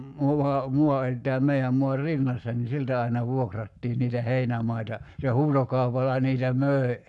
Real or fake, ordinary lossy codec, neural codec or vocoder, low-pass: fake; none; codec, 44.1 kHz, 7.8 kbps, Pupu-Codec; 10.8 kHz